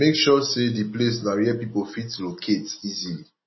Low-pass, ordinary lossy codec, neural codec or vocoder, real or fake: 7.2 kHz; MP3, 24 kbps; none; real